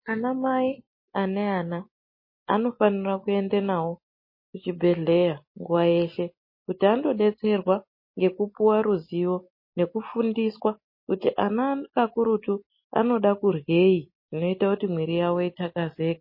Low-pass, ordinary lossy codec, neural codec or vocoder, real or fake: 5.4 kHz; MP3, 24 kbps; none; real